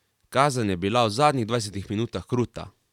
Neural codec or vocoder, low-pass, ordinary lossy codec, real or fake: vocoder, 44.1 kHz, 128 mel bands every 512 samples, BigVGAN v2; 19.8 kHz; none; fake